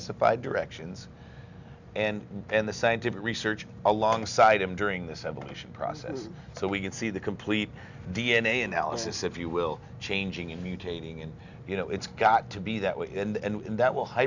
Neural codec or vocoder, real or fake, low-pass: none; real; 7.2 kHz